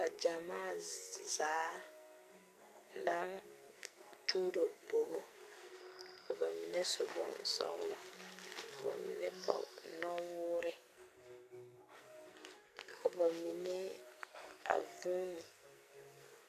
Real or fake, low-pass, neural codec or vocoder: fake; 14.4 kHz; codec, 44.1 kHz, 2.6 kbps, SNAC